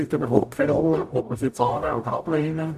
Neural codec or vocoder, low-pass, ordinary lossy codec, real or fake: codec, 44.1 kHz, 0.9 kbps, DAC; 14.4 kHz; AAC, 96 kbps; fake